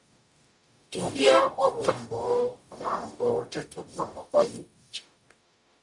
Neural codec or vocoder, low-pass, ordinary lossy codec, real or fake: codec, 44.1 kHz, 0.9 kbps, DAC; 10.8 kHz; MP3, 96 kbps; fake